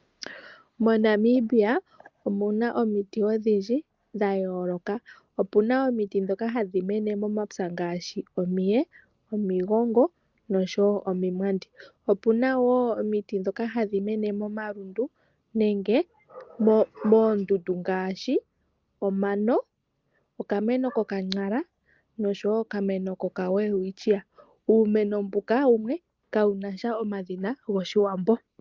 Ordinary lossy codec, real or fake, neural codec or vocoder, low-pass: Opus, 24 kbps; real; none; 7.2 kHz